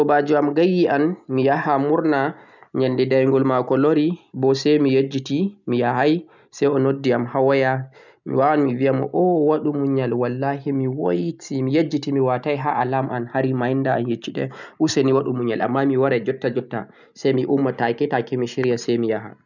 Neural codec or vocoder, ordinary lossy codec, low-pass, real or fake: none; none; 7.2 kHz; real